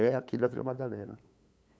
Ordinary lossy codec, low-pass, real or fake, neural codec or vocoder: none; none; fake; codec, 16 kHz, 8 kbps, FunCodec, trained on LibriTTS, 25 frames a second